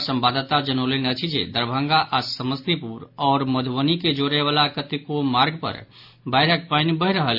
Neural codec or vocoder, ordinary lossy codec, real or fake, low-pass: none; none; real; 5.4 kHz